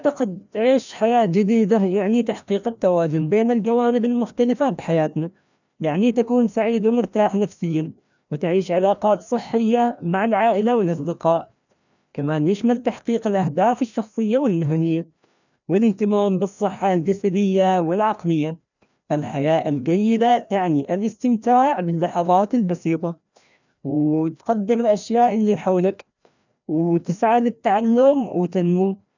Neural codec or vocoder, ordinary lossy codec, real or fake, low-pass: codec, 16 kHz, 1 kbps, FreqCodec, larger model; none; fake; 7.2 kHz